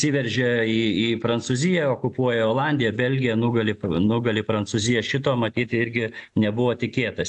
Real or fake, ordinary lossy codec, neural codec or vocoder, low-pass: real; AAC, 64 kbps; none; 10.8 kHz